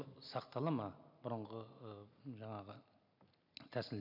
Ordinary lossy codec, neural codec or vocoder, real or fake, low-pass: none; none; real; 5.4 kHz